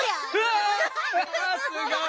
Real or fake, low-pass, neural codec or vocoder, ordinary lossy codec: real; none; none; none